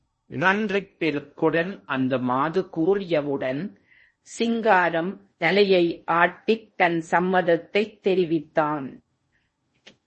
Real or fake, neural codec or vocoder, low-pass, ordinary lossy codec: fake; codec, 16 kHz in and 24 kHz out, 0.6 kbps, FocalCodec, streaming, 2048 codes; 10.8 kHz; MP3, 32 kbps